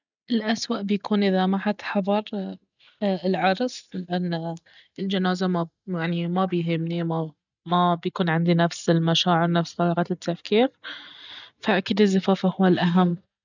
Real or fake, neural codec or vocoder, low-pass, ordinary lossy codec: real; none; 7.2 kHz; none